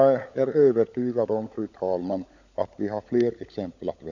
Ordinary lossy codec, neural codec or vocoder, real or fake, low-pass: none; codec, 16 kHz, 16 kbps, FunCodec, trained on LibriTTS, 50 frames a second; fake; 7.2 kHz